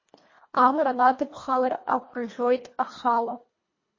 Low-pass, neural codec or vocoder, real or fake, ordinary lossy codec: 7.2 kHz; codec, 24 kHz, 1.5 kbps, HILCodec; fake; MP3, 32 kbps